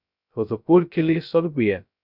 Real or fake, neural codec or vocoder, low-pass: fake; codec, 16 kHz, 0.2 kbps, FocalCodec; 5.4 kHz